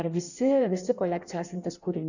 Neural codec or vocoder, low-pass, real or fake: codec, 16 kHz in and 24 kHz out, 1.1 kbps, FireRedTTS-2 codec; 7.2 kHz; fake